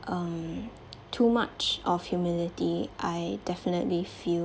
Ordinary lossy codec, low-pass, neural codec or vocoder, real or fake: none; none; none; real